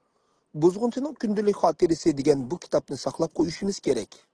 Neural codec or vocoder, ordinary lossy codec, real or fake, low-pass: none; Opus, 16 kbps; real; 9.9 kHz